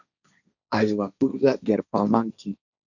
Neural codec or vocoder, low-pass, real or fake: codec, 16 kHz, 1.1 kbps, Voila-Tokenizer; 7.2 kHz; fake